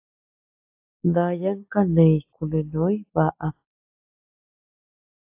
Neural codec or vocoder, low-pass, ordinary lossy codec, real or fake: none; 3.6 kHz; AAC, 32 kbps; real